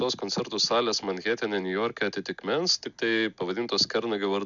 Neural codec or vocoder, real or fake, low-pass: none; real; 7.2 kHz